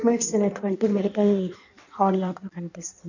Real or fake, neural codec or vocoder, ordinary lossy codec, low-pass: fake; codec, 16 kHz in and 24 kHz out, 1.1 kbps, FireRedTTS-2 codec; none; 7.2 kHz